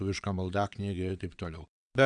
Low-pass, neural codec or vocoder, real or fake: 9.9 kHz; vocoder, 22.05 kHz, 80 mel bands, WaveNeXt; fake